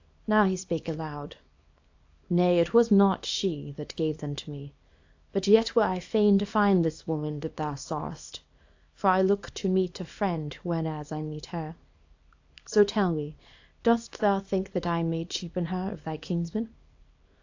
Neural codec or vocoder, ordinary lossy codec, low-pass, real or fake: codec, 24 kHz, 0.9 kbps, WavTokenizer, small release; AAC, 48 kbps; 7.2 kHz; fake